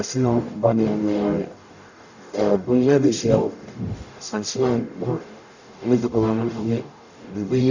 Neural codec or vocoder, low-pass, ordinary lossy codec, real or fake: codec, 44.1 kHz, 0.9 kbps, DAC; 7.2 kHz; none; fake